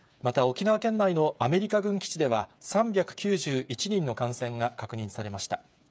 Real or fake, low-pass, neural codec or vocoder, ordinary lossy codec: fake; none; codec, 16 kHz, 8 kbps, FreqCodec, smaller model; none